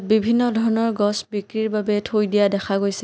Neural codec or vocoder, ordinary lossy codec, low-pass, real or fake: none; none; none; real